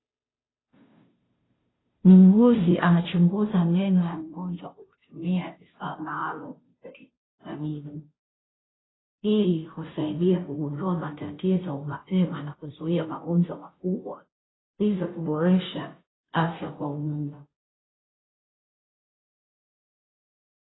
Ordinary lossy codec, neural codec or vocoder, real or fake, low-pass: AAC, 16 kbps; codec, 16 kHz, 0.5 kbps, FunCodec, trained on Chinese and English, 25 frames a second; fake; 7.2 kHz